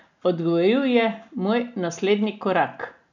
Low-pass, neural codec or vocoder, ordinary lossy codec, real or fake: 7.2 kHz; none; none; real